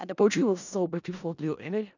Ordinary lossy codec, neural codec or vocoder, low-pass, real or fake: none; codec, 16 kHz in and 24 kHz out, 0.4 kbps, LongCat-Audio-Codec, four codebook decoder; 7.2 kHz; fake